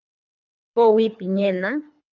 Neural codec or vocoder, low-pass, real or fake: codec, 24 kHz, 3 kbps, HILCodec; 7.2 kHz; fake